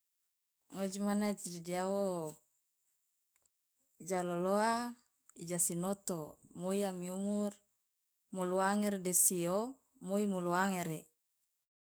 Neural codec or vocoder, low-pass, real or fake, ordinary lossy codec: codec, 44.1 kHz, 7.8 kbps, DAC; none; fake; none